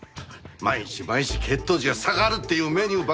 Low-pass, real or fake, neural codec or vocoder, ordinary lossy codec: none; real; none; none